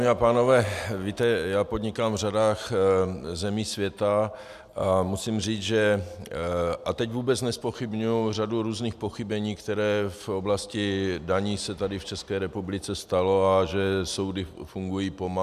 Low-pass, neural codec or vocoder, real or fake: 14.4 kHz; none; real